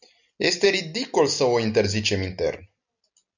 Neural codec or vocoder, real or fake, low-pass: none; real; 7.2 kHz